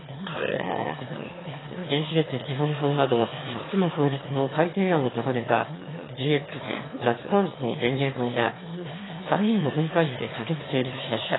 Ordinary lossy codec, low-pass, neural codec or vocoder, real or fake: AAC, 16 kbps; 7.2 kHz; autoencoder, 22.05 kHz, a latent of 192 numbers a frame, VITS, trained on one speaker; fake